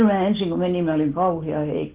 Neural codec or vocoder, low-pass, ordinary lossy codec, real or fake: none; 3.6 kHz; Opus, 16 kbps; real